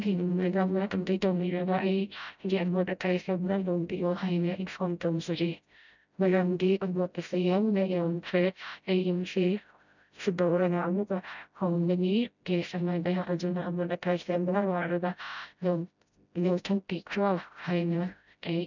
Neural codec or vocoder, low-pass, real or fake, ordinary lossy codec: codec, 16 kHz, 0.5 kbps, FreqCodec, smaller model; 7.2 kHz; fake; none